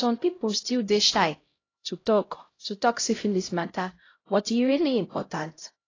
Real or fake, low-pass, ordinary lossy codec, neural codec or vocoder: fake; 7.2 kHz; AAC, 32 kbps; codec, 16 kHz, 0.5 kbps, X-Codec, HuBERT features, trained on LibriSpeech